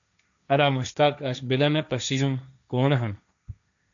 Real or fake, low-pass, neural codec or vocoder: fake; 7.2 kHz; codec, 16 kHz, 1.1 kbps, Voila-Tokenizer